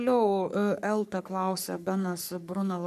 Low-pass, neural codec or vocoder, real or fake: 14.4 kHz; codec, 44.1 kHz, 3.4 kbps, Pupu-Codec; fake